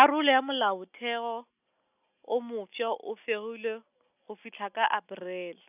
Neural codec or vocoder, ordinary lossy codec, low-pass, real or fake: none; none; 3.6 kHz; real